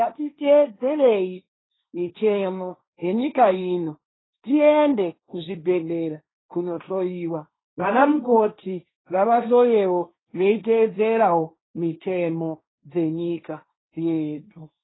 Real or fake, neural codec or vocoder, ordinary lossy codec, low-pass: fake; codec, 16 kHz, 1.1 kbps, Voila-Tokenizer; AAC, 16 kbps; 7.2 kHz